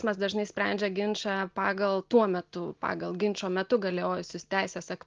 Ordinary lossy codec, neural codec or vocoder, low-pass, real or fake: Opus, 32 kbps; none; 7.2 kHz; real